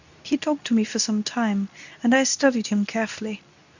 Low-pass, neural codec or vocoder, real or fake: 7.2 kHz; codec, 24 kHz, 0.9 kbps, WavTokenizer, medium speech release version 2; fake